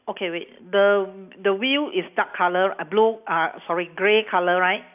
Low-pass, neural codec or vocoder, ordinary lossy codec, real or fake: 3.6 kHz; none; none; real